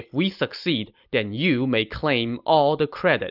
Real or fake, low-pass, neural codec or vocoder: real; 5.4 kHz; none